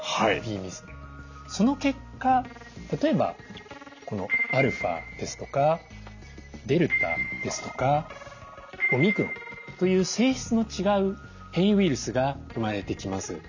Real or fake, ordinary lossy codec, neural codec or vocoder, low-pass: real; none; none; 7.2 kHz